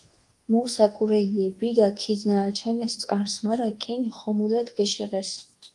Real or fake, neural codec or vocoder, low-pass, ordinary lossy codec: fake; codec, 24 kHz, 1.2 kbps, DualCodec; 10.8 kHz; Opus, 24 kbps